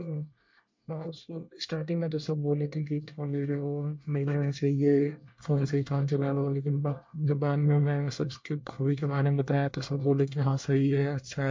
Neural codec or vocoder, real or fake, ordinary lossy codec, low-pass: codec, 24 kHz, 1 kbps, SNAC; fake; MP3, 48 kbps; 7.2 kHz